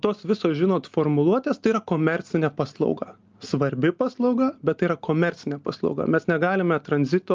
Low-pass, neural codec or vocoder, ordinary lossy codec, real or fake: 7.2 kHz; none; Opus, 24 kbps; real